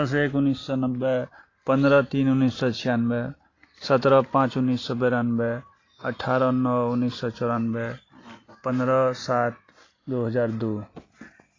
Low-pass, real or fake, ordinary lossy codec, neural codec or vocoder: 7.2 kHz; real; AAC, 32 kbps; none